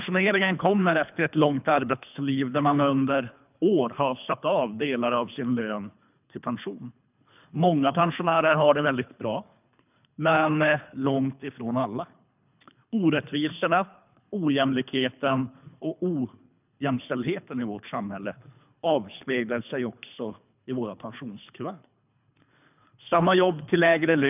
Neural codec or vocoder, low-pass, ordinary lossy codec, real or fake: codec, 24 kHz, 3 kbps, HILCodec; 3.6 kHz; none; fake